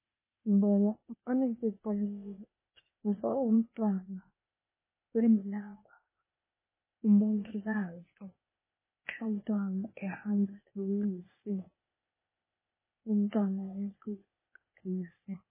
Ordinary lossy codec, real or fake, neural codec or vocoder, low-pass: MP3, 16 kbps; fake; codec, 16 kHz, 0.8 kbps, ZipCodec; 3.6 kHz